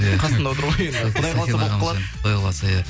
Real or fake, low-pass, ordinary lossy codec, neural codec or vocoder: real; none; none; none